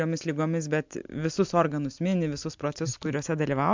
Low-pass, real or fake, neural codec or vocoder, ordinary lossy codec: 7.2 kHz; real; none; MP3, 64 kbps